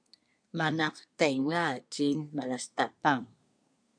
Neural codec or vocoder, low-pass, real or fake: codec, 24 kHz, 1 kbps, SNAC; 9.9 kHz; fake